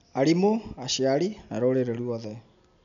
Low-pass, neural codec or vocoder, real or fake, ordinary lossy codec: 7.2 kHz; none; real; none